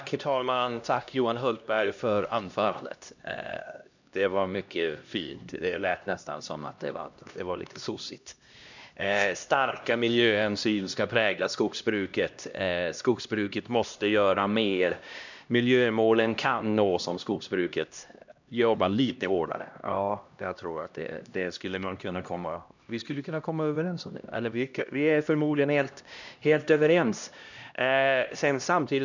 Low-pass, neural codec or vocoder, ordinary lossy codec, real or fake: 7.2 kHz; codec, 16 kHz, 1 kbps, X-Codec, HuBERT features, trained on LibriSpeech; none; fake